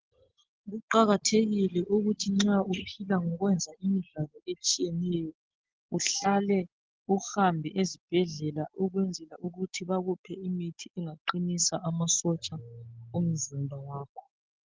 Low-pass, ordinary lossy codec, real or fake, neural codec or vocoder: 7.2 kHz; Opus, 16 kbps; real; none